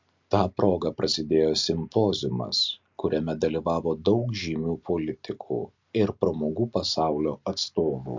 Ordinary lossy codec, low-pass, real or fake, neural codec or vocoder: MP3, 64 kbps; 7.2 kHz; real; none